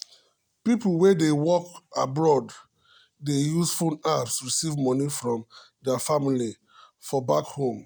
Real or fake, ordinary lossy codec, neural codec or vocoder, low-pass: real; none; none; none